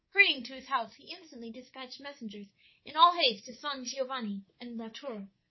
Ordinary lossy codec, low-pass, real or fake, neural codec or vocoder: MP3, 24 kbps; 7.2 kHz; fake; codec, 24 kHz, 3.1 kbps, DualCodec